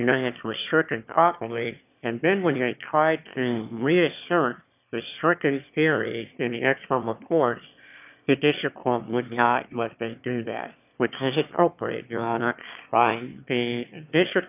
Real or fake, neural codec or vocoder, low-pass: fake; autoencoder, 22.05 kHz, a latent of 192 numbers a frame, VITS, trained on one speaker; 3.6 kHz